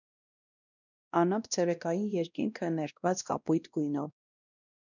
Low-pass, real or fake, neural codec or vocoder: 7.2 kHz; fake; codec, 16 kHz, 1 kbps, X-Codec, WavLM features, trained on Multilingual LibriSpeech